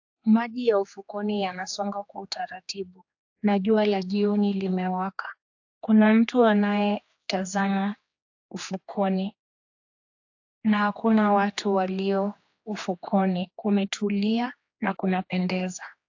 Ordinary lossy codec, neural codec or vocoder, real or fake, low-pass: AAC, 48 kbps; codec, 16 kHz, 2 kbps, X-Codec, HuBERT features, trained on general audio; fake; 7.2 kHz